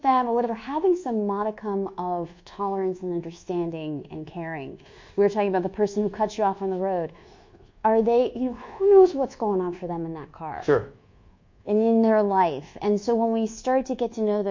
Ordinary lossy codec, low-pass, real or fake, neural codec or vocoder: MP3, 48 kbps; 7.2 kHz; fake; codec, 24 kHz, 1.2 kbps, DualCodec